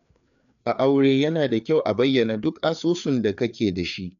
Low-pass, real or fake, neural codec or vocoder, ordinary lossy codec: 7.2 kHz; fake; codec, 16 kHz, 4 kbps, FreqCodec, larger model; none